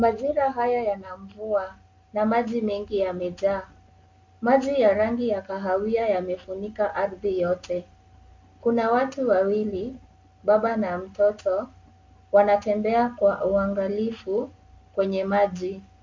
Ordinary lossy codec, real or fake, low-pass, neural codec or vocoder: MP3, 48 kbps; real; 7.2 kHz; none